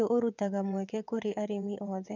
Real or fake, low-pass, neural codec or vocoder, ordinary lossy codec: fake; 7.2 kHz; vocoder, 22.05 kHz, 80 mel bands, WaveNeXt; none